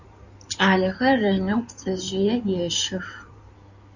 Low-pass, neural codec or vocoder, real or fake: 7.2 kHz; codec, 16 kHz in and 24 kHz out, 2.2 kbps, FireRedTTS-2 codec; fake